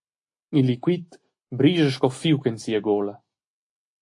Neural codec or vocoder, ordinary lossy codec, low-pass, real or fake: none; AAC, 48 kbps; 10.8 kHz; real